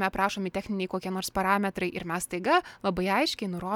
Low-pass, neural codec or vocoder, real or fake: 19.8 kHz; none; real